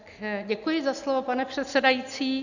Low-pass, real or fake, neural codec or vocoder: 7.2 kHz; real; none